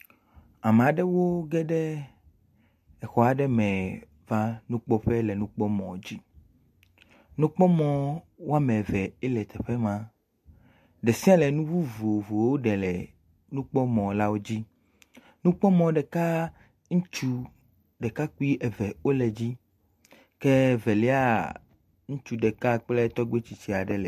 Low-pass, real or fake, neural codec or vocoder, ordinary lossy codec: 14.4 kHz; real; none; MP3, 64 kbps